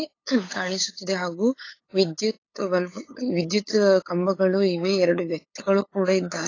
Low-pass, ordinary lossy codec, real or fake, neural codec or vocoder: 7.2 kHz; AAC, 32 kbps; fake; codec, 16 kHz, 8 kbps, FunCodec, trained on LibriTTS, 25 frames a second